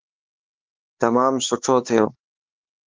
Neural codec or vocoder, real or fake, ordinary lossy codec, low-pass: codec, 16 kHz, 4 kbps, X-Codec, HuBERT features, trained on LibriSpeech; fake; Opus, 16 kbps; 7.2 kHz